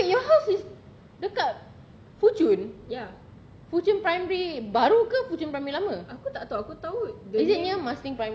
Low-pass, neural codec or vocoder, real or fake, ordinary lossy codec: none; none; real; none